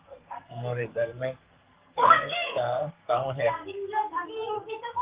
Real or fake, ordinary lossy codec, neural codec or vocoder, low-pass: fake; Opus, 32 kbps; codec, 44.1 kHz, 2.6 kbps, SNAC; 3.6 kHz